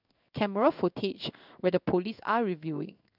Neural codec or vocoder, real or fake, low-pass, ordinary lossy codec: codec, 16 kHz in and 24 kHz out, 1 kbps, XY-Tokenizer; fake; 5.4 kHz; none